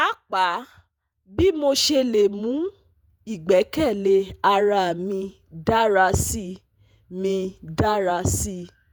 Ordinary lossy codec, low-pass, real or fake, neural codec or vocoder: none; none; fake; vocoder, 48 kHz, 128 mel bands, Vocos